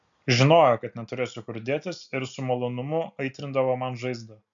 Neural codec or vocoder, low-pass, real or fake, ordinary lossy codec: none; 7.2 kHz; real; MP3, 64 kbps